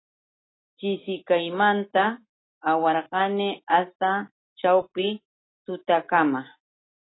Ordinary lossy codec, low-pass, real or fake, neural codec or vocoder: AAC, 16 kbps; 7.2 kHz; real; none